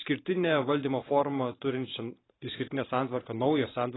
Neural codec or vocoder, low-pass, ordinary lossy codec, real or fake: vocoder, 44.1 kHz, 128 mel bands every 512 samples, BigVGAN v2; 7.2 kHz; AAC, 16 kbps; fake